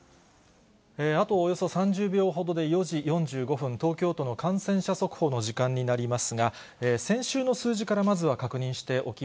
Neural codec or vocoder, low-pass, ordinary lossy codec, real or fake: none; none; none; real